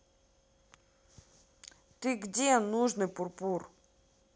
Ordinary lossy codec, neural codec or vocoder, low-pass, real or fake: none; none; none; real